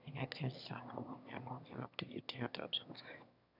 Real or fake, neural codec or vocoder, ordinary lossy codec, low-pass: fake; autoencoder, 22.05 kHz, a latent of 192 numbers a frame, VITS, trained on one speaker; none; 5.4 kHz